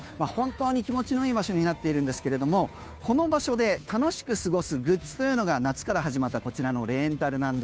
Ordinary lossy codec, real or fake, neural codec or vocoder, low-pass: none; fake; codec, 16 kHz, 2 kbps, FunCodec, trained on Chinese and English, 25 frames a second; none